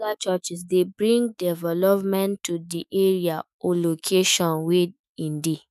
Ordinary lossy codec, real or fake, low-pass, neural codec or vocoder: none; fake; 14.4 kHz; autoencoder, 48 kHz, 128 numbers a frame, DAC-VAE, trained on Japanese speech